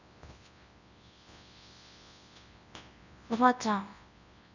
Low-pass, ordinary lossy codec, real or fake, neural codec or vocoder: 7.2 kHz; none; fake; codec, 24 kHz, 0.9 kbps, WavTokenizer, large speech release